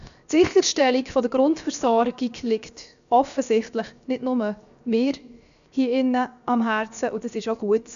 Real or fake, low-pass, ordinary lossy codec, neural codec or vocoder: fake; 7.2 kHz; none; codec, 16 kHz, 0.7 kbps, FocalCodec